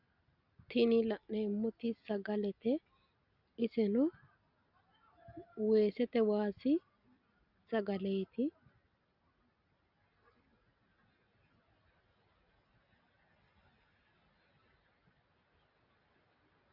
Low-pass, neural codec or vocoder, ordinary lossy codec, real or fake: 5.4 kHz; none; Opus, 64 kbps; real